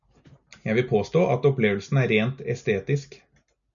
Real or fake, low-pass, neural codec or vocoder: real; 7.2 kHz; none